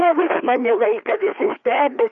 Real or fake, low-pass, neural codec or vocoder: fake; 7.2 kHz; codec, 16 kHz, 2 kbps, FreqCodec, larger model